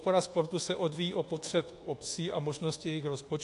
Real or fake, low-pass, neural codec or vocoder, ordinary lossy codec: fake; 10.8 kHz; codec, 24 kHz, 1.2 kbps, DualCodec; AAC, 48 kbps